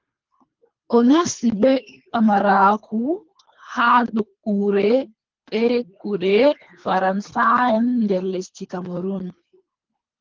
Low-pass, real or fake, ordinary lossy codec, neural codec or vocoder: 7.2 kHz; fake; Opus, 32 kbps; codec, 24 kHz, 3 kbps, HILCodec